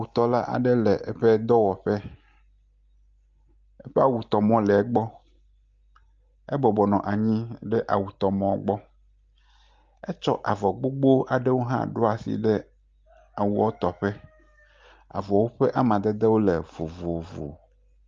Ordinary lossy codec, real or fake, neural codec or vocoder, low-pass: Opus, 24 kbps; real; none; 7.2 kHz